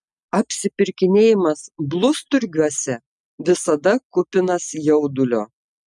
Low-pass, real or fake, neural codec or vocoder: 10.8 kHz; real; none